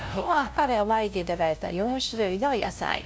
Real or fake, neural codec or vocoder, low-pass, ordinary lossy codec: fake; codec, 16 kHz, 0.5 kbps, FunCodec, trained on LibriTTS, 25 frames a second; none; none